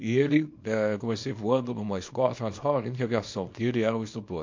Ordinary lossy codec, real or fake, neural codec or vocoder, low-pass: MP3, 48 kbps; fake; codec, 24 kHz, 0.9 kbps, WavTokenizer, small release; 7.2 kHz